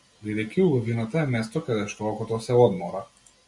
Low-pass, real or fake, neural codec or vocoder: 10.8 kHz; real; none